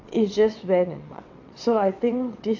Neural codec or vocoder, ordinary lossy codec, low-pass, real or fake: vocoder, 22.05 kHz, 80 mel bands, WaveNeXt; AAC, 48 kbps; 7.2 kHz; fake